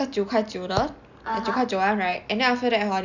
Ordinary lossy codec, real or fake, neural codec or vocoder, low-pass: none; real; none; 7.2 kHz